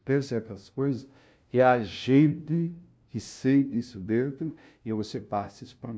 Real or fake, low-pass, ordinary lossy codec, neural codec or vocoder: fake; none; none; codec, 16 kHz, 0.5 kbps, FunCodec, trained on LibriTTS, 25 frames a second